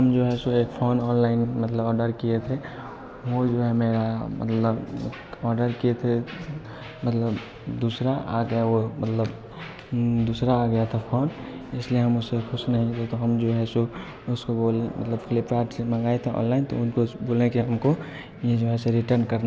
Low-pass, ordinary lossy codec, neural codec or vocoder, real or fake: none; none; none; real